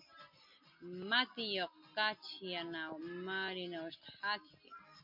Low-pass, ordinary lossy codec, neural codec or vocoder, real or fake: 5.4 kHz; MP3, 48 kbps; none; real